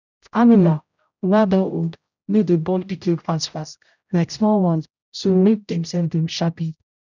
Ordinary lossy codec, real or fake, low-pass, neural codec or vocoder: none; fake; 7.2 kHz; codec, 16 kHz, 0.5 kbps, X-Codec, HuBERT features, trained on general audio